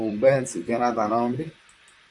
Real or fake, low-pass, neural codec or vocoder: fake; 10.8 kHz; vocoder, 44.1 kHz, 128 mel bands, Pupu-Vocoder